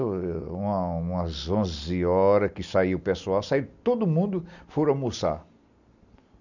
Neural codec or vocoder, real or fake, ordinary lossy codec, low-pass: none; real; none; 7.2 kHz